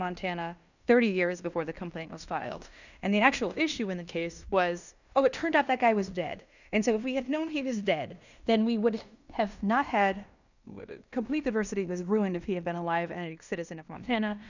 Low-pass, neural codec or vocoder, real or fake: 7.2 kHz; codec, 16 kHz in and 24 kHz out, 0.9 kbps, LongCat-Audio-Codec, fine tuned four codebook decoder; fake